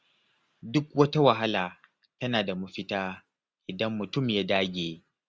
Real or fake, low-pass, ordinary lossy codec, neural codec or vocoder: real; none; none; none